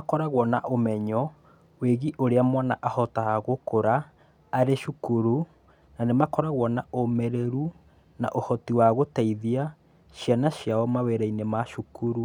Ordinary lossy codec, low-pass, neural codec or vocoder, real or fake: none; 19.8 kHz; none; real